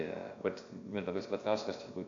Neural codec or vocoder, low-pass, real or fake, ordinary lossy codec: codec, 16 kHz, about 1 kbps, DyCAST, with the encoder's durations; 7.2 kHz; fake; AAC, 48 kbps